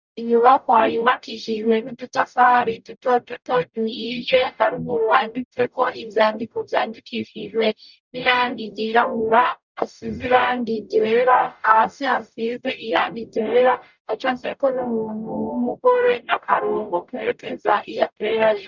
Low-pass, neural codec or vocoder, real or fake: 7.2 kHz; codec, 44.1 kHz, 0.9 kbps, DAC; fake